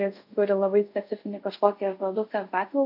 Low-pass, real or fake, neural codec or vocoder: 5.4 kHz; fake; codec, 24 kHz, 0.5 kbps, DualCodec